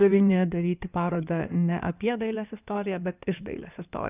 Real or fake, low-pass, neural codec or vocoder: fake; 3.6 kHz; codec, 16 kHz in and 24 kHz out, 2.2 kbps, FireRedTTS-2 codec